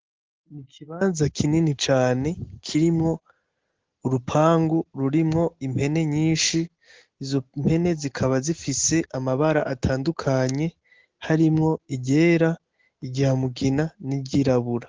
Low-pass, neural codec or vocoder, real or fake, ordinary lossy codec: 7.2 kHz; none; real; Opus, 16 kbps